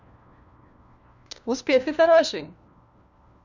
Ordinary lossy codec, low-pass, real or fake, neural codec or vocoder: none; 7.2 kHz; fake; codec, 16 kHz, 1 kbps, FunCodec, trained on LibriTTS, 50 frames a second